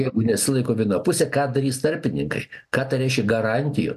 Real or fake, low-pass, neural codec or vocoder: real; 14.4 kHz; none